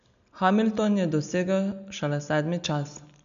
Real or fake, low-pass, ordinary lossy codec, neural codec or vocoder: real; 7.2 kHz; none; none